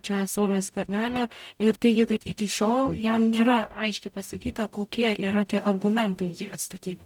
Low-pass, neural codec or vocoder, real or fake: 19.8 kHz; codec, 44.1 kHz, 0.9 kbps, DAC; fake